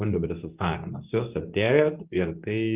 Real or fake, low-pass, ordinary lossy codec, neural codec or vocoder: fake; 3.6 kHz; Opus, 32 kbps; codec, 24 kHz, 0.9 kbps, WavTokenizer, medium speech release version 2